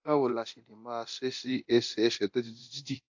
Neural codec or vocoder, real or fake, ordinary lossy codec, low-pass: codec, 24 kHz, 0.9 kbps, DualCodec; fake; AAC, 48 kbps; 7.2 kHz